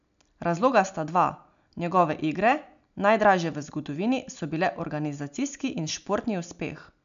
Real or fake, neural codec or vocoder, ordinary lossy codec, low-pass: real; none; none; 7.2 kHz